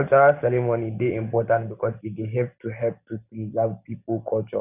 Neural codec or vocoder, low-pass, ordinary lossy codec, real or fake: none; 3.6 kHz; none; real